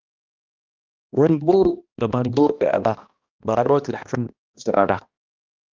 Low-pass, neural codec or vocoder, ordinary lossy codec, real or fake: 7.2 kHz; codec, 16 kHz, 1 kbps, X-Codec, HuBERT features, trained on balanced general audio; Opus, 32 kbps; fake